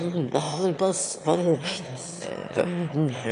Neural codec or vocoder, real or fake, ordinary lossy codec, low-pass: autoencoder, 22.05 kHz, a latent of 192 numbers a frame, VITS, trained on one speaker; fake; AAC, 48 kbps; 9.9 kHz